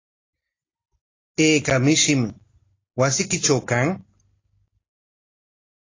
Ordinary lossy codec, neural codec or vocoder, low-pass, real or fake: AAC, 32 kbps; none; 7.2 kHz; real